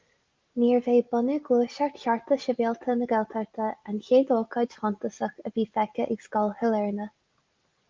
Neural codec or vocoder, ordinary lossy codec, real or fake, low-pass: none; Opus, 32 kbps; real; 7.2 kHz